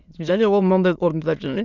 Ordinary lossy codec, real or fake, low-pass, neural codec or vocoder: none; fake; 7.2 kHz; autoencoder, 22.05 kHz, a latent of 192 numbers a frame, VITS, trained on many speakers